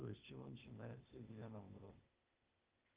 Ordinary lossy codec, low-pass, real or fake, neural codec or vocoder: Opus, 32 kbps; 3.6 kHz; fake; codec, 16 kHz, 0.8 kbps, ZipCodec